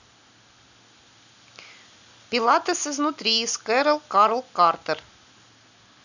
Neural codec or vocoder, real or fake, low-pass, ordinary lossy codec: none; real; 7.2 kHz; none